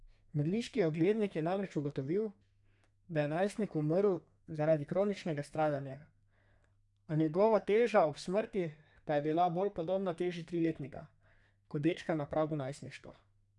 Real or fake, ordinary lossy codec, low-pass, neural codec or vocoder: fake; none; 10.8 kHz; codec, 32 kHz, 1.9 kbps, SNAC